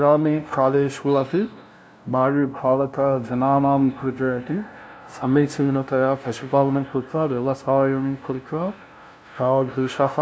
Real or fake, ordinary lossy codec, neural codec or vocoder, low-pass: fake; none; codec, 16 kHz, 0.5 kbps, FunCodec, trained on LibriTTS, 25 frames a second; none